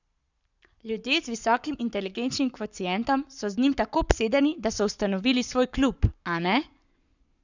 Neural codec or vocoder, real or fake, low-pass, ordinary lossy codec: codec, 44.1 kHz, 7.8 kbps, Pupu-Codec; fake; 7.2 kHz; none